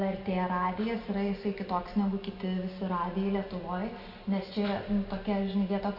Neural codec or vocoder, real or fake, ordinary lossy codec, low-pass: none; real; AAC, 48 kbps; 5.4 kHz